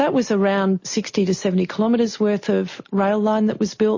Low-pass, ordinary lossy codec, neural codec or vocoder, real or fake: 7.2 kHz; MP3, 32 kbps; none; real